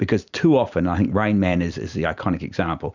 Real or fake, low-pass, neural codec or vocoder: fake; 7.2 kHz; vocoder, 44.1 kHz, 128 mel bands every 256 samples, BigVGAN v2